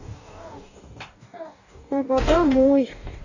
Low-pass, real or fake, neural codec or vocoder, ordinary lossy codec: 7.2 kHz; fake; codec, 44.1 kHz, 2.6 kbps, DAC; none